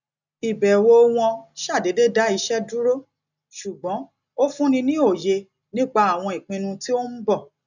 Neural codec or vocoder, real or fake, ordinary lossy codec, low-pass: none; real; none; 7.2 kHz